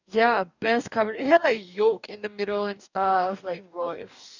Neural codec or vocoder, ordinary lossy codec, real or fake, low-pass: codec, 44.1 kHz, 2.6 kbps, DAC; none; fake; 7.2 kHz